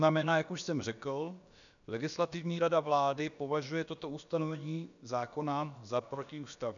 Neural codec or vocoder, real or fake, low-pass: codec, 16 kHz, about 1 kbps, DyCAST, with the encoder's durations; fake; 7.2 kHz